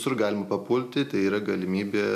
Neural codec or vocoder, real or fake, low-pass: none; real; 14.4 kHz